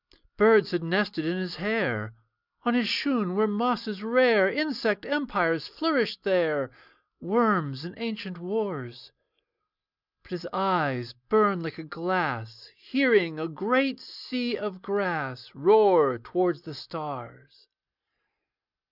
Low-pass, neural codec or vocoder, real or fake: 5.4 kHz; none; real